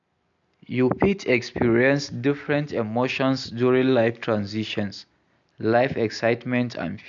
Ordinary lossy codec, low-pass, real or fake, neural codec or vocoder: MP3, 64 kbps; 7.2 kHz; real; none